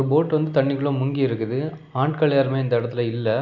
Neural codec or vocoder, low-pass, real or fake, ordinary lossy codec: none; 7.2 kHz; real; none